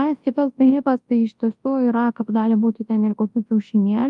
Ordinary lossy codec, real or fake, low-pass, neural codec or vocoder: Opus, 32 kbps; fake; 10.8 kHz; codec, 24 kHz, 0.9 kbps, WavTokenizer, large speech release